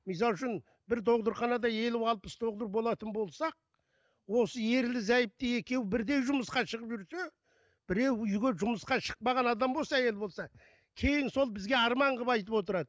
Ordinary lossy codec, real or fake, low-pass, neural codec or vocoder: none; real; none; none